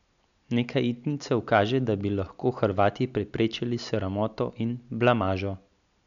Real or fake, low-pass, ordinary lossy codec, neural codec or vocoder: real; 7.2 kHz; none; none